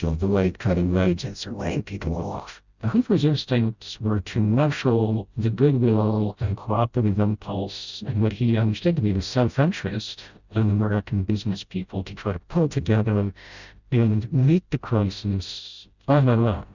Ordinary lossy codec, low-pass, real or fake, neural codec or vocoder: Opus, 64 kbps; 7.2 kHz; fake; codec, 16 kHz, 0.5 kbps, FreqCodec, smaller model